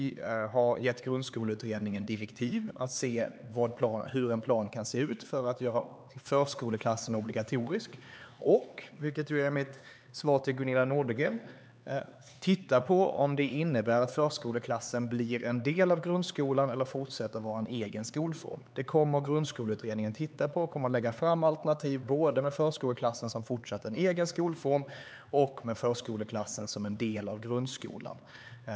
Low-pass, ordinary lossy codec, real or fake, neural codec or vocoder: none; none; fake; codec, 16 kHz, 4 kbps, X-Codec, HuBERT features, trained on LibriSpeech